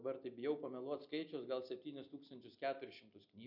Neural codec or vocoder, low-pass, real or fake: none; 5.4 kHz; real